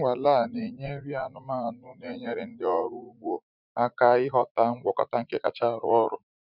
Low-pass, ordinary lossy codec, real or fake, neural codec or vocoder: 5.4 kHz; none; fake; vocoder, 44.1 kHz, 80 mel bands, Vocos